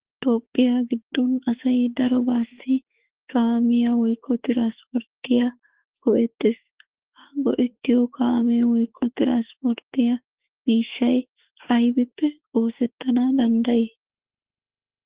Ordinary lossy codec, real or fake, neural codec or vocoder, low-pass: Opus, 16 kbps; fake; autoencoder, 48 kHz, 32 numbers a frame, DAC-VAE, trained on Japanese speech; 3.6 kHz